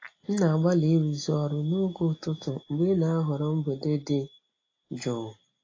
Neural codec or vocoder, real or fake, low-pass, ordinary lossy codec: none; real; 7.2 kHz; AAC, 32 kbps